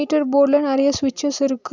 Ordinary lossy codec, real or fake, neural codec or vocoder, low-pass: none; real; none; 7.2 kHz